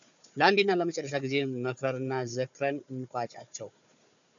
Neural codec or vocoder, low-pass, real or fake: codec, 16 kHz, 4 kbps, FunCodec, trained on Chinese and English, 50 frames a second; 7.2 kHz; fake